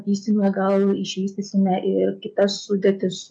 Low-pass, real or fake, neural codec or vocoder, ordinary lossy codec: 9.9 kHz; real; none; MP3, 64 kbps